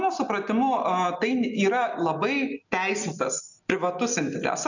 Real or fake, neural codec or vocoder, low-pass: real; none; 7.2 kHz